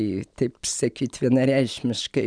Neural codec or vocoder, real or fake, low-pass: none; real; 9.9 kHz